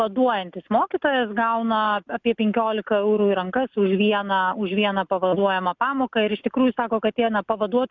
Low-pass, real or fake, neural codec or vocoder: 7.2 kHz; real; none